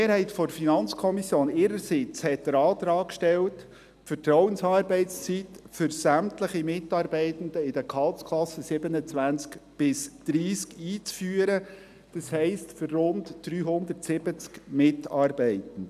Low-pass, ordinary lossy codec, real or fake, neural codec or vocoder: 14.4 kHz; none; real; none